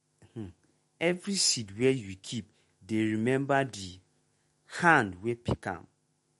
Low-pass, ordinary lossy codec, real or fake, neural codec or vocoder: 19.8 kHz; MP3, 48 kbps; fake; autoencoder, 48 kHz, 128 numbers a frame, DAC-VAE, trained on Japanese speech